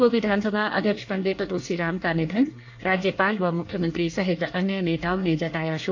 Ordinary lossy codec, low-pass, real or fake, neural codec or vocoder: AAC, 48 kbps; 7.2 kHz; fake; codec, 24 kHz, 1 kbps, SNAC